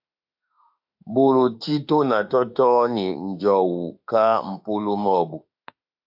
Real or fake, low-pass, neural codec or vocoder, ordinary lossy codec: fake; 5.4 kHz; autoencoder, 48 kHz, 32 numbers a frame, DAC-VAE, trained on Japanese speech; AAC, 48 kbps